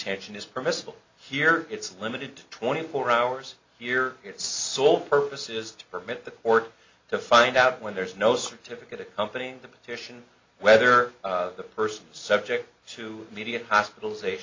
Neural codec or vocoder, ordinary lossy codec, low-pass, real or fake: none; MP3, 48 kbps; 7.2 kHz; real